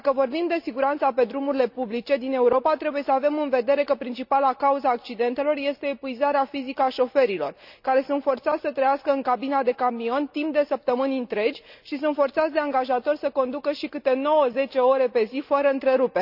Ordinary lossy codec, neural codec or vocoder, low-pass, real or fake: none; none; 5.4 kHz; real